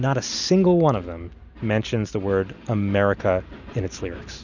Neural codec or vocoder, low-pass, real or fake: none; 7.2 kHz; real